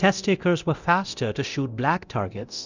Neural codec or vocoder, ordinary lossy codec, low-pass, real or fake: codec, 24 kHz, 0.9 kbps, DualCodec; Opus, 64 kbps; 7.2 kHz; fake